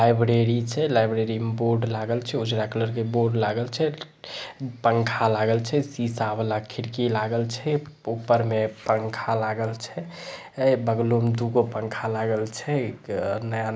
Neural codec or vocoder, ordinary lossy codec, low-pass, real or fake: none; none; none; real